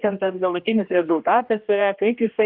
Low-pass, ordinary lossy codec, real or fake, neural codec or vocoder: 5.4 kHz; Opus, 24 kbps; fake; codec, 16 kHz, 1 kbps, X-Codec, HuBERT features, trained on general audio